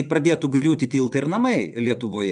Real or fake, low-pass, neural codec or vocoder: fake; 9.9 kHz; vocoder, 22.05 kHz, 80 mel bands, WaveNeXt